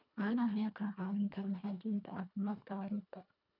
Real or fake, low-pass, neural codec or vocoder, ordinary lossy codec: fake; 5.4 kHz; codec, 24 kHz, 1.5 kbps, HILCodec; none